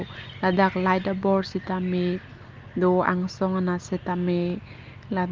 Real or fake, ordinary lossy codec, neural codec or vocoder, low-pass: real; Opus, 32 kbps; none; 7.2 kHz